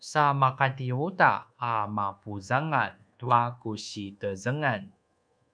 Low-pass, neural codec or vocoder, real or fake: 9.9 kHz; codec, 24 kHz, 1.2 kbps, DualCodec; fake